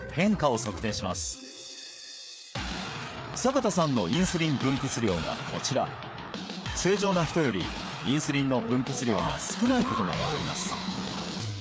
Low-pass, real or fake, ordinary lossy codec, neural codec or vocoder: none; fake; none; codec, 16 kHz, 4 kbps, FreqCodec, larger model